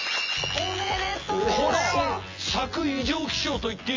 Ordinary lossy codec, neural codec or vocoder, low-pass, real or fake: MP3, 48 kbps; vocoder, 24 kHz, 100 mel bands, Vocos; 7.2 kHz; fake